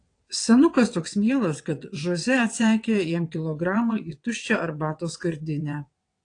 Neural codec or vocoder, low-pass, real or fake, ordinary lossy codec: vocoder, 22.05 kHz, 80 mel bands, WaveNeXt; 9.9 kHz; fake; AAC, 48 kbps